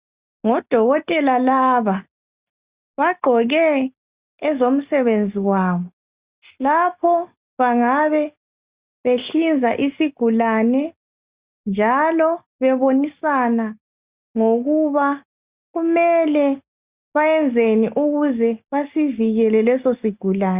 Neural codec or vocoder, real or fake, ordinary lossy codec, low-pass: none; real; Opus, 64 kbps; 3.6 kHz